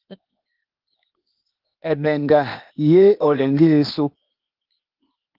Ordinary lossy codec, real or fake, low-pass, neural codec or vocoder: Opus, 32 kbps; fake; 5.4 kHz; codec, 16 kHz, 0.8 kbps, ZipCodec